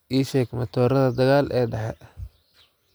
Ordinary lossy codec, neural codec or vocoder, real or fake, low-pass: none; none; real; none